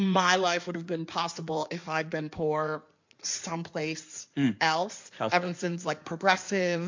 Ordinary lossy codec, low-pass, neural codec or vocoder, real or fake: MP3, 48 kbps; 7.2 kHz; codec, 16 kHz in and 24 kHz out, 2.2 kbps, FireRedTTS-2 codec; fake